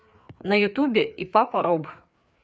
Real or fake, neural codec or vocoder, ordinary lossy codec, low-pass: fake; codec, 16 kHz, 4 kbps, FreqCodec, larger model; none; none